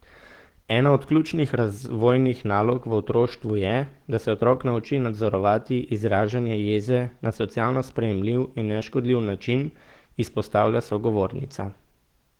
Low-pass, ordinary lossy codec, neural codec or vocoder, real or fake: 19.8 kHz; Opus, 16 kbps; codec, 44.1 kHz, 7.8 kbps, DAC; fake